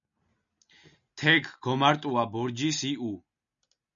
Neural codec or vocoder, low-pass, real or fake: none; 7.2 kHz; real